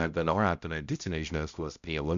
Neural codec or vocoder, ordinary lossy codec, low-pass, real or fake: codec, 16 kHz, 0.5 kbps, X-Codec, HuBERT features, trained on balanced general audio; Opus, 64 kbps; 7.2 kHz; fake